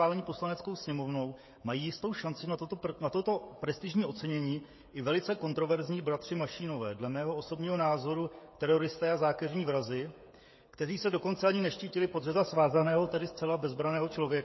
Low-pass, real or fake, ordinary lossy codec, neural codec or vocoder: 7.2 kHz; fake; MP3, 24 kbps; codec, 16 kHz, 16 kbps, FreqCodec, smaller model